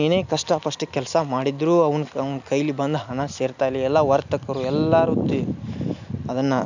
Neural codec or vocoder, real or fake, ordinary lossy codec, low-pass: none; real; none; 7.2 kHz